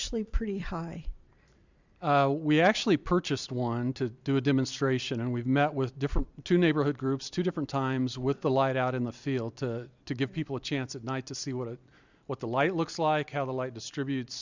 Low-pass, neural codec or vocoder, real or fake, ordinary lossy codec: 7.2 kHz; vocoder, 44.1 kHz, 128 mel bands every 256 samples, BigVGAN v2; fake; Opus, 64 kbps